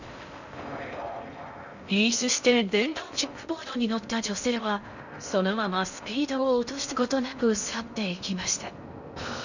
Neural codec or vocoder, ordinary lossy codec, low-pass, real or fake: codec, 16 kHz in and 24 kHz out, 0.6 kbps, FocalCodec, streaming, 4096 codes; none; 7.2 kHz; fake